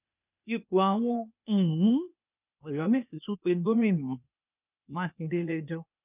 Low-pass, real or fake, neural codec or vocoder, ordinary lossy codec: 3.6 kHz; fake; codec, 16 kHz, 0.8 kbps, ZipCodec; none